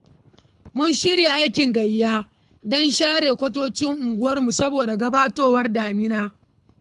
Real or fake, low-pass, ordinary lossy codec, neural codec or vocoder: fake; 10.8 kHz; AAC, 96 kbps; codec, 24 kHz, 3 kbps, HILCodec